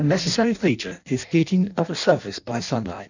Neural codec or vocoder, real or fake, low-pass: codec, 16 kHz in and 24 kHz out, 0.6 kbps, FireRedTTS-2 codec; fake; 7.2 kHz